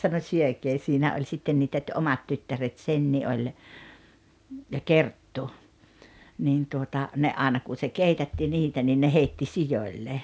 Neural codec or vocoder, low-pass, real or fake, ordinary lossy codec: none; none; real; none